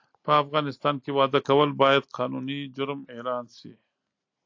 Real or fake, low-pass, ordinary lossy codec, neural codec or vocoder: real; 7.2 kHz; AAC, 48 kbps; none